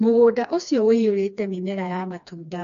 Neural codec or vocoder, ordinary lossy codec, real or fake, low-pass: codec, 16 kHz, 2 kbps, FreqCodec, smaller model; AAC, 64 kbps; fake; 7.2 kHz